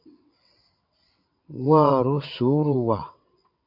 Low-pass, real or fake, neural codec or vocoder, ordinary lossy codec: 5.4 kHz; fake; vocoder, 22.05 kHz, 80 mel bands, WaveNeXt; AAC, 48 kbps